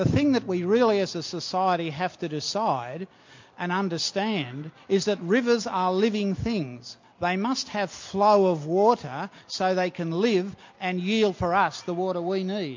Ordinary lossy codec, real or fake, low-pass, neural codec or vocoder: MP3, 48 kbps; real; 7.2 kHz; none